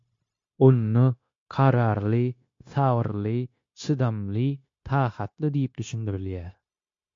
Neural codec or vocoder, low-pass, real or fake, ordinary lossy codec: codec, 16 kHz, 0.9 kbps, LongCat-Audio-Codec; 7.2 kHz; fake; MP3, 48 kbps